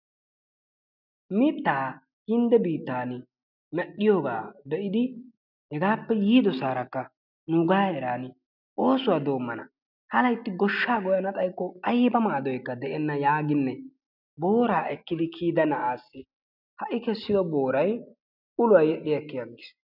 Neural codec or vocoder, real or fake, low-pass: none; real; 5.4 kHz